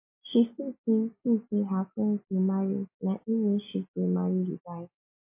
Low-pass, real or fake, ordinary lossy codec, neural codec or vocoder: 3.6 kHz; real; MP3, 24 kbps; none